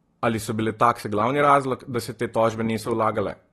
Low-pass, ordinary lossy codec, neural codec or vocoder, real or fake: 19.8 kHz; AAC, 32 kbps; none; real